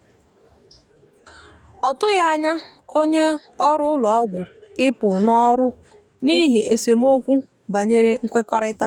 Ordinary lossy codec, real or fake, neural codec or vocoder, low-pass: none; fake; codec, 44.1 kHz, 2.6 kbps, DAC; 19.8 kHz